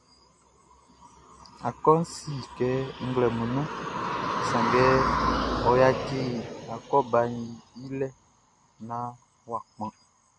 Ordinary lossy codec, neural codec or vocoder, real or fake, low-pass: MP3, 64 kbps; none; real; 10.8 kHz